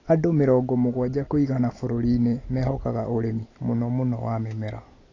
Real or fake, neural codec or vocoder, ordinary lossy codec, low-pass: real; none; AAC, 32 kbps; 7.2 kHz